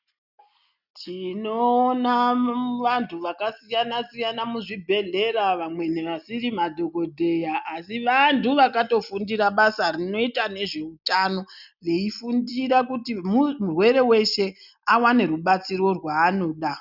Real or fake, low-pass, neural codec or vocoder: real; 5.4 kHz; none